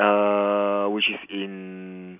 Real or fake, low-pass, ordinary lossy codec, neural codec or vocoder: real; 3.6 kHz; none; none